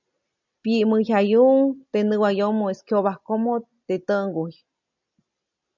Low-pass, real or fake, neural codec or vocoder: 7.2 kHz; real; none